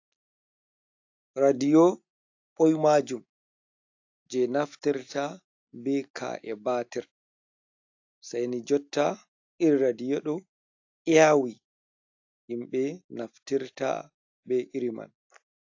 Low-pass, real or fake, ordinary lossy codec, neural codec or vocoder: 7.2 kHz; real; AAC, 48 kbps; none